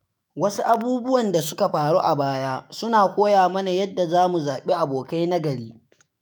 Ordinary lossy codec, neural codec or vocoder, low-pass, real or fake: none; autoencoder, 48 kHz, 128 numbers a frame, DAC-VAE, trained on Japanese speech; none; fake